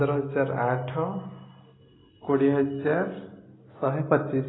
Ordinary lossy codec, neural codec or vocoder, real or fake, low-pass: AAC, 16 kbps; none; real; 7.2 kHz